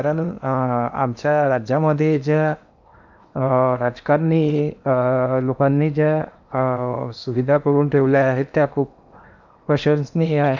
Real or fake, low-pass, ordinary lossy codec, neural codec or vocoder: fake; 7.2 kHz; none; codec, 16 kHz in and 24 kHz out, 0.8 kbps, FocalCodec, streaming, 65536 codes